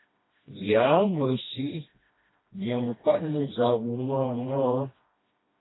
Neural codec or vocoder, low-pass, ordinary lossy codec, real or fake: codec, 16 kHz, 1 kbps, FreqCodec, smaller model; 7.2 kHz; AAC, 16 kbps; fake